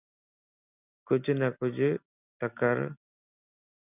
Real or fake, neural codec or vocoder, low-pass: real; none; 3.6 kHz